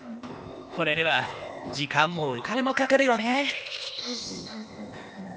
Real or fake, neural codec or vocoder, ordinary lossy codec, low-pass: fake; codec, 16 kHz, 0.8 kbps, ZipCodec; none; none